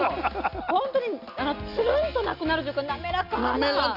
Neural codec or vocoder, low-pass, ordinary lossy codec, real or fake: none; 5.4 kHz; none; real